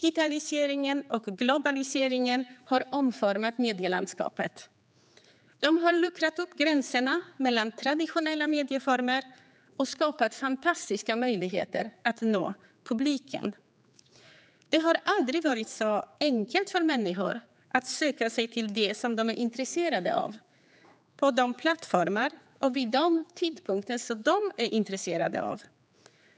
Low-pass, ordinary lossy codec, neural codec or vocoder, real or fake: none; none; codec, 16 kHz, 4 kbps, X-Codec, HuBERT features, trained on general audio; fake